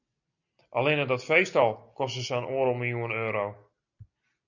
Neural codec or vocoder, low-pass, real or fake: none; 7.2 kHz; real